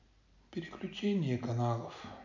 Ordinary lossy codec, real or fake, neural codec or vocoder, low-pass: none; real; none; 7.2 kHz